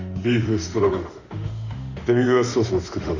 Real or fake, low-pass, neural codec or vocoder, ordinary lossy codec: fake; 7.2 kHz; autoencoder, 48 kHz, 32 numbers a frame, DAC-VAE, trained on Japanese speech; Opus, 64 kbps